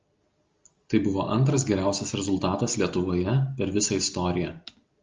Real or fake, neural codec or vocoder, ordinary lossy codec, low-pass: real; none; Opus, 32 kbps; 7.2 kHz